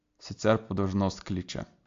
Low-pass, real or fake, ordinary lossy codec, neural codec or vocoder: 7.2 kHz; real; AAC, 48 kbps; none